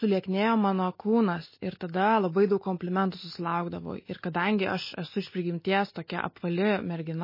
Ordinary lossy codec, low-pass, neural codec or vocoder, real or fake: MP3, 24 kbps; 5.4 kHz; none; real